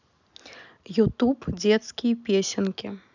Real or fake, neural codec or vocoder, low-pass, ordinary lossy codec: real; none; 7.2 kHz; none